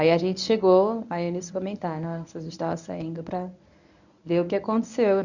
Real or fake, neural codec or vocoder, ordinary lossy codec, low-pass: fake; codec, 24 kHz, 0.9 kbps, WavTokenizer, medium speech release version 1; none; 7.2 kHz